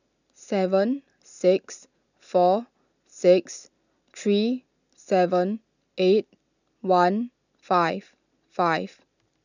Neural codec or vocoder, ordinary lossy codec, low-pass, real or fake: none; none; 7.2 kHz; real